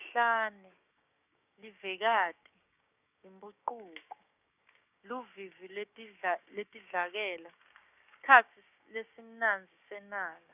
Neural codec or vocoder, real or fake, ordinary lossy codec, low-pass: codec, 16 kHz, 6 kbps, DAC; fake; none; 3.6 kHz